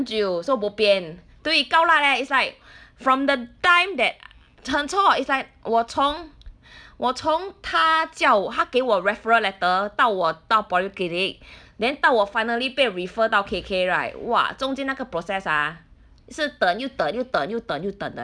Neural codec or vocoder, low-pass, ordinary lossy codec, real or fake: none; 9.9 kHz; none; real